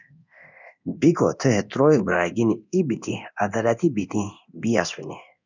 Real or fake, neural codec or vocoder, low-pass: fake; codec, 24 kHz, 0.9 kbps, DualCodec; 7.2 kHz